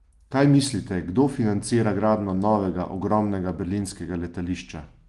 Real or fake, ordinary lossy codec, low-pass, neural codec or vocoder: real; Opus, 24 kbps; 10.8 kHz; none